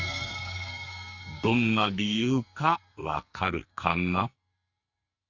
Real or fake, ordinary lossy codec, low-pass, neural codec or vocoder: fake; Opus, 64 kbps; 7.2 kHz; codec, 44.1 kHz, 2.6 kbps, SNAC